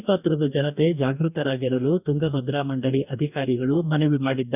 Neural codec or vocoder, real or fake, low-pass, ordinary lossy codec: codec, 44.1 kHz, 2.6 kbps, DAC; fake; 3.6 kHz; none